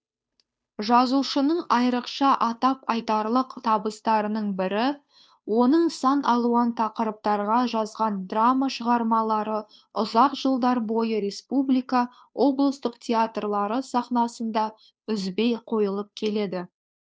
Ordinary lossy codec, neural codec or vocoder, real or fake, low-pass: none; codec, 16 kHz, 2 kbps, FunCodec, trained on Chinese and English, 25 frames a second; fake; none